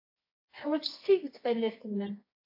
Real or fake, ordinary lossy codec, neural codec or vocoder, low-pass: fake; AAC, 32 kbps; codec, 24 kHz, 0.9 kbps, WavTokenizer, medium music audio release; 5.4 kHz